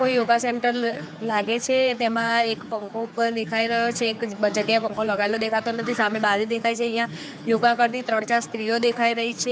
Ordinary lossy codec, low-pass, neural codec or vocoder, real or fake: none; none; codec, 16 kHz, 4 kbps, X-Codec, HuBERT features, trained on general audio; fake